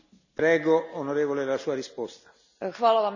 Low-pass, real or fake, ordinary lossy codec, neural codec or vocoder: 7.2 kHz; real; none; none